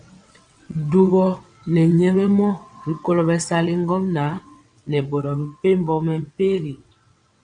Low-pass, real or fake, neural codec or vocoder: 9.9 kHz; fake; vocoder, 22.05 kHz, 80 mel bands, WaveNeXt